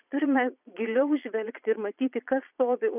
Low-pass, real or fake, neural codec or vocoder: 3.6 kHz; fake; vocoder, 22.05 kHz, 80 mel bands, Vocos